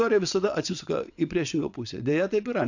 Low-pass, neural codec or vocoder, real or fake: 7.2 kHz; vocoder, 22.05 kHz, 80 mel bands, WaveNeXt; fake